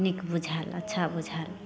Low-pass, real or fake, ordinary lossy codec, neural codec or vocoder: none; real; none; none